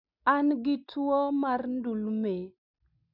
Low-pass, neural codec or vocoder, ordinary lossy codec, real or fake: 5.4 kHz; none; none; real